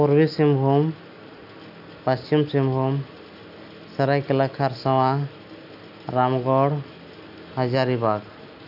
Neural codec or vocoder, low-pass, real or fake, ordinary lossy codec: none; 5.4 kHz; real; AAC, 48 kbps